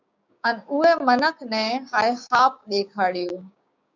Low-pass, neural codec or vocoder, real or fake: 7.2 kHz; codec, 16 kHz, 6 kbps, DAC; fake